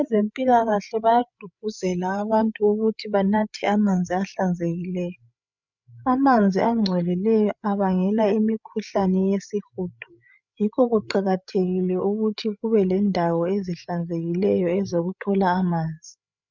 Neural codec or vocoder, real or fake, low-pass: codec, 16 kHz, 8 kbps, FreqCodec, larger model; fake; 7.2 kHz